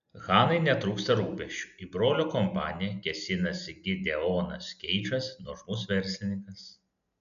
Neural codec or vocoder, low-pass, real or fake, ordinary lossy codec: none; 7.2 kHz; real; MP3, 96 kbps